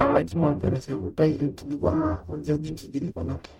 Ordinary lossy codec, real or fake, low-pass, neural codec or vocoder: MP3, 64 kbps; fake; 19.8 kHz; codec, 44.1 kHz, 0.9 kbps, DAC